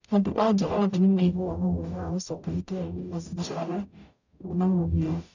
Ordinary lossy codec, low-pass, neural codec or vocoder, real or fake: none; 7.2 kHz; codec, 44.1 kHz, 0.9 kbps, DAC; fake